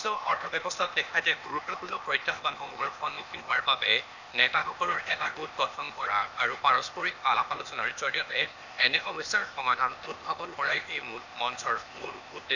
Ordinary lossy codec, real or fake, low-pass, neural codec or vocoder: none; fake; 7.2 kHz; codec, 16 kHz, 0.8 kbps, ZipCodec